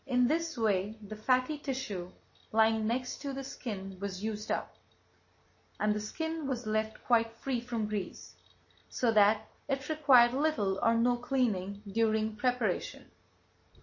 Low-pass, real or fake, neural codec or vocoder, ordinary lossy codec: 7.2 kHz; real; none; MP3, 32 kbps